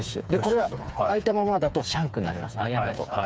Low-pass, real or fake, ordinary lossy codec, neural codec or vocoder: none; fake; none; codec, 16 kHz, 4 kbps, FreqCodec, smaller model